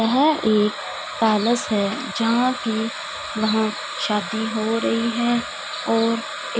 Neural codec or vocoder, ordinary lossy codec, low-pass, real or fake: none; none; none; real